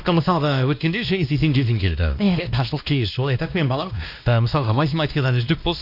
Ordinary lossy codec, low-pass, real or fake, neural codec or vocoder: none; 5.4 kHz; fake; codec, 16 kHz, 1 kbps, X-Codec, WavLM features, trained on Multilingual LibriSpeech